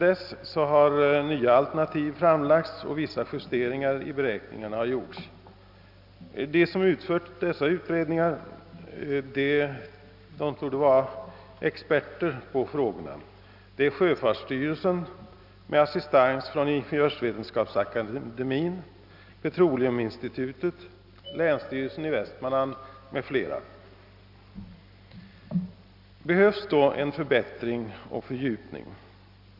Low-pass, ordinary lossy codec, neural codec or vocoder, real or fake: 5.4 kHz; none; none; real